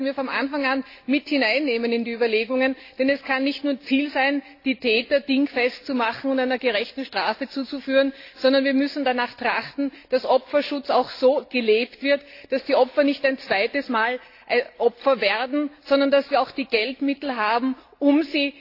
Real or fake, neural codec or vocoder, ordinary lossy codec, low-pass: real; none; AAC, 32 kbps; 5.4 kHz